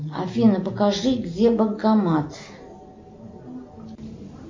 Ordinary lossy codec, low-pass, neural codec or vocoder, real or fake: MP3, 48 kbps; 7.2 kHz; none; real